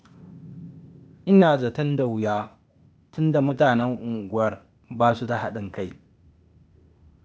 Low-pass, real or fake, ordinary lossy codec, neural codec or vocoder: none; fake; none; codec, 16 kHz, 0.8 kbps, ZipCodec